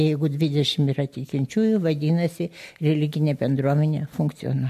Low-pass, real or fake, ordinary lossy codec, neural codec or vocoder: 14.4 kHz; real; MP3, 64 kbps; none